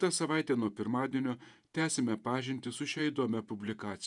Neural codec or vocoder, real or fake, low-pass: vocoder, 48 kHz, 128 mel bands, Vocos; fake; 10.8 kHz